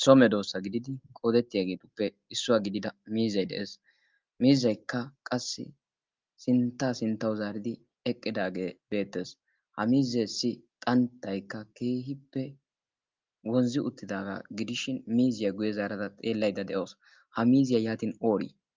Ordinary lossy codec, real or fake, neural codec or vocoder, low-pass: Opus, 24 kbps; real; none; 7.2 kHz